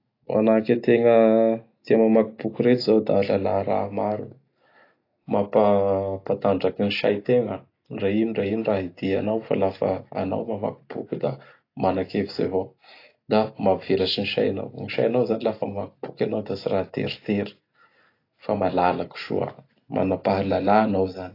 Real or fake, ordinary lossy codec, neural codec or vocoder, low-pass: real; AAC, 32 kbps; none; 5.4 kHz